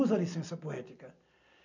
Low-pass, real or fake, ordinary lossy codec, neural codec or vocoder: 7.2 kHz; real; none; none